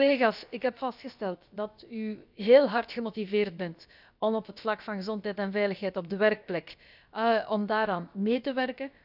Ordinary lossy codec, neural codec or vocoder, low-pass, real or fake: none; codec, 16 kHz, about 1 kbps, DyCAST, with the encoder's durations; 5.4 kHz; fake